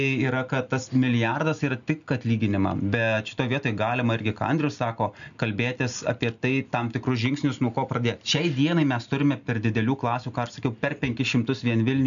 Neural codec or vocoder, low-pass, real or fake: none; 7.2 kHz; real